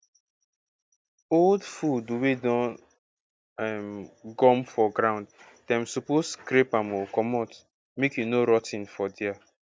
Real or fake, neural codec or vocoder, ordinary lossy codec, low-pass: real; none; none; none